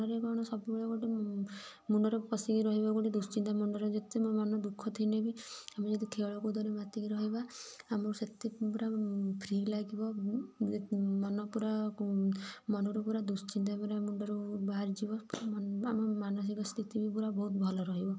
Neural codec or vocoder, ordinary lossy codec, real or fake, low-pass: none; none; real; none